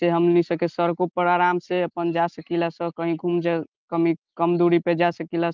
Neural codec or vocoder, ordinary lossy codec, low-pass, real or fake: none; Opus, 24 kbps; 7.2 kHz; real